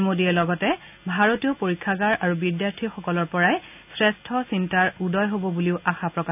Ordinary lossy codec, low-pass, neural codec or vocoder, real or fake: none; 3.6 kHz; none; real